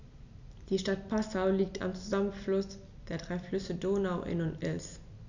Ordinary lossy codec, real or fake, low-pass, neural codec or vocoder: none; real; 7.2 kHz; none